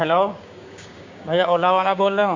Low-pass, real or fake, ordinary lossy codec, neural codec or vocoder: 7.2 kHz; fake; MP3, 48 kbps; codec, 16 kHz, 6 kbps, DAC